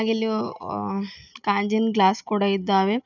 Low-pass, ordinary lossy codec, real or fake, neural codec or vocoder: 7.2 kHz; none; real; none